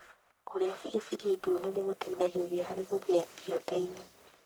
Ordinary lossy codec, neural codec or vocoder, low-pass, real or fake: none; codec, 44.1 kHz, 1.7 kbps, Pupu-Codec; none; fake